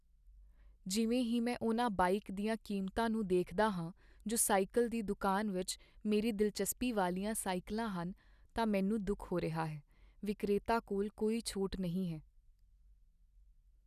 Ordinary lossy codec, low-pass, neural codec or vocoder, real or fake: none; 14.4 kHz; none; real